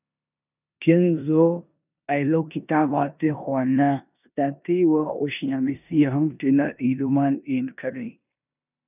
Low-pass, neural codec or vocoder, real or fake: 3.6 kHz; codec, 16 kHz in and 24 kHz out, 0.9 kbps, LongCat-Audio-Codec, four codebook decoder; fake